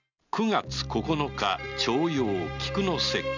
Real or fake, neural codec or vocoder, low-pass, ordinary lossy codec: real; none; 7.2 kHz; none